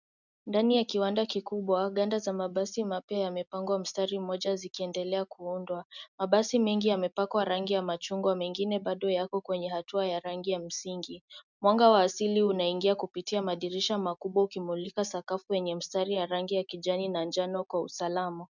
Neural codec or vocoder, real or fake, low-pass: none; real; 7.2 kHz